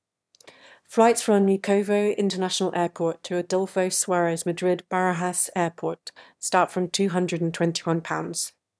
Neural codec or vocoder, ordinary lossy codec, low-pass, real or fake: autoencoder, 22.05 kHz, a latent of 192 numbers a frame, VITS, trained on one speaker; none; none; fake